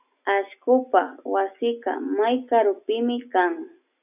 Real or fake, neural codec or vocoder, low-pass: real; none; 3.6 kHz